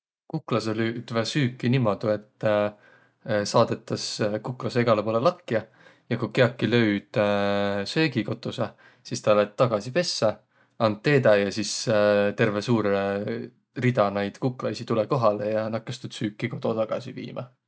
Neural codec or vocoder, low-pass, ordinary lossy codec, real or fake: none; none; none; real